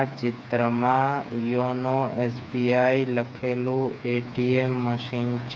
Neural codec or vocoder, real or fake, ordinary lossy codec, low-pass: codec, 16 kHz, 4 kbps, FreqCodec, smaller model; fake; none; none